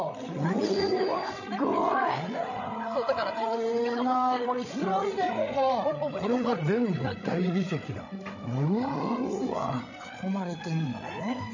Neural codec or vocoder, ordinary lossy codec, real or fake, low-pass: codec, 16 kHz, 8 kbps, FreqCodec, larger model; none; fake; 7.2 kHz